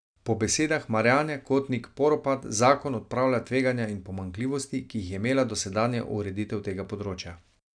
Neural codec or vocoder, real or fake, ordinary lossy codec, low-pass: none; real; none; 9.9 kHz